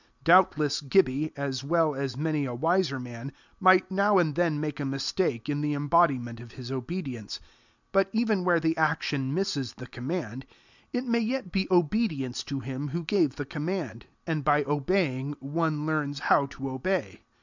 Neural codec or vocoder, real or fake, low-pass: none; real; 7.2 kHz